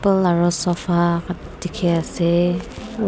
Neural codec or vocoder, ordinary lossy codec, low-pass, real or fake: none; none; none; real